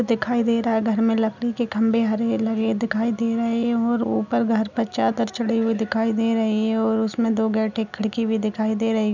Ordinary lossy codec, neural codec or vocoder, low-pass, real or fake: none; none; 7.2 kHz; real